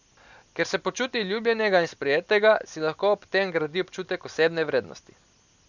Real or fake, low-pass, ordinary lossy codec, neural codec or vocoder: real; 7.2 kHz; none; none